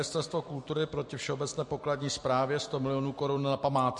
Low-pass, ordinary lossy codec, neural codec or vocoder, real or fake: 10.8 kHz; MP3, 48 kbps; none; real